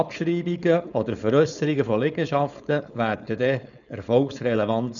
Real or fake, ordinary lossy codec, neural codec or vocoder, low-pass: fake; none; codec, 16 kHz, 4.8 kbps, FACodec; 7.2 kHz